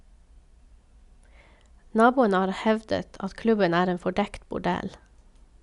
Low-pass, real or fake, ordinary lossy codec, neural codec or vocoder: 10.8 kHz; real; MP3, 96 kbps; none